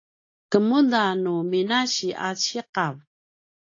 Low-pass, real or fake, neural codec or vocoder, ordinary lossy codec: 7.2 kHz; real; none; AAC, 48 kbps